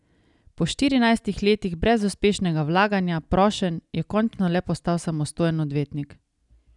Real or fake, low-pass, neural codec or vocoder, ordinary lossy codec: real; 10.8 kHz; none; none